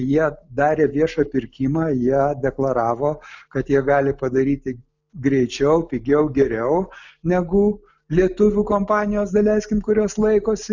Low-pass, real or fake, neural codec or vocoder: 7.2 kHz; real; none